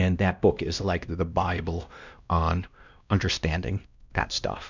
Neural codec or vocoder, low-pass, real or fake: codec, 16 kHz, 1 kbps, X-Codec, WavLM features, trained on Multilingual LibriSpeech; 7.2 kHz; fake